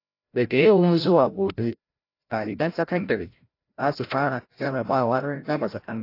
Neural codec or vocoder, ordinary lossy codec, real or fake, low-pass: codec, 16 kHz, 0.5 kbps, FreqCodec, larger model; AAC, 32 kbps; fake; 5.4 kHz